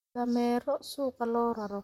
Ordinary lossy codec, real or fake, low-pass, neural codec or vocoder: MP3, 64 kbps; fake; 19.8 kHz; vocoder, 44.1 kHz, 128 mel bands, Pupu-Vocoder